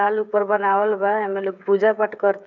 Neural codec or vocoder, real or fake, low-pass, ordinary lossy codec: codec, 16 kHz, 8 kbps, FreqCodec, smaller model; fake; 7.2 kHz; none